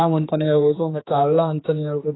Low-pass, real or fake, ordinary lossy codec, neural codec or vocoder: 7.2 kHz; fake; AAC, 16 kbps; codec, 16 kHz, 2 kbps, X-Codec, HuBERT features, trained on general audio